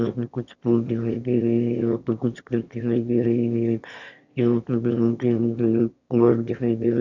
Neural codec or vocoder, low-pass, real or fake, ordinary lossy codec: autoencoder, 22.05 kHz, a latent of 192 numbers a frame, VITS, trained on one speaker; 7.2 kHz; fake; none